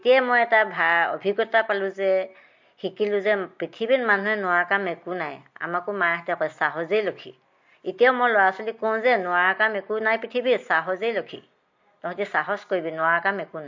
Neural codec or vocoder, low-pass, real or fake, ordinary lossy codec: none; 7.2 kHz; real; MP3, 48 kbps